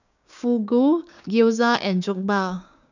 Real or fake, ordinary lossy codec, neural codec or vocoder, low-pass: fake; none; codec, 16 kHz, 6 kbps, DAC; 7.2 kHz